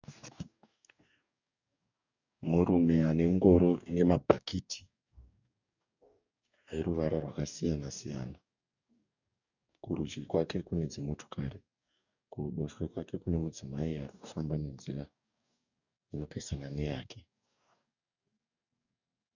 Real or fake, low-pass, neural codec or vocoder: fake; 7.2 kHz; codec, 44.1 kHz, 2.6 kbps, DAC